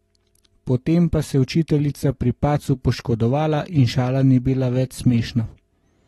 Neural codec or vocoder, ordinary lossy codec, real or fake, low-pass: none; AAC, 32 kbps; real; 19.8 kHz